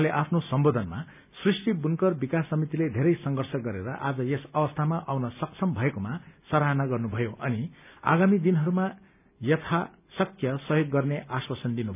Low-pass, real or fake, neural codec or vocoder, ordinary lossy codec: 3.6 kHz; real; none; none